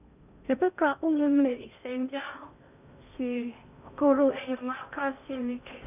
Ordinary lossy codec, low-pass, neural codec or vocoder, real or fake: none; 3.6 kHz; codec, 16 kHz in and 24 kHz out, 0.6 kbps, FocalCodec, streaming, 4096 codes; fake